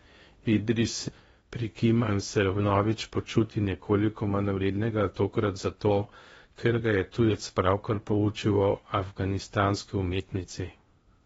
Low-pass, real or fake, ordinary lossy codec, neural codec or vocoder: 10.8 kHz; fake; AAC, 24 kbps; codec, 16 kHz in and 24 kHz out, 0.8 kbps, FocalCodec, streaming, 65536 codes